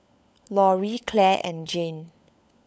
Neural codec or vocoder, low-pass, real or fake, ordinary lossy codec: codec, 16 kHz, 16 kbps, FunCodec, trained on LibriTTS, 50 frames a second; none; fake; none